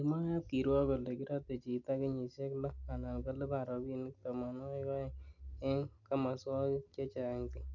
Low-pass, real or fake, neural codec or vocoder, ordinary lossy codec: 7.2 kHz; real; none; none